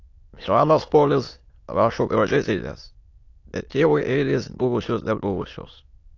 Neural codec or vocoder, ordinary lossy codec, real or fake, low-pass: autoencoder, 22.05 kHz, a latent of 192 numbers a frame, VITS, trained on many speakers; AAC, 48 kbps; fake; 7.2 kHz